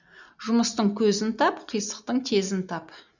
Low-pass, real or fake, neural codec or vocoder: 7.2 kHz; real; none